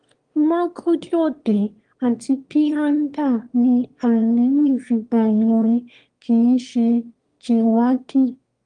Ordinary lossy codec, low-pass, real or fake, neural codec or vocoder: Opus, 24 kbps; 9.9 kHz; fake; autoencoder, 22.05 kHz, a latent of 192 numbers a frame, VITS, trained on one speaker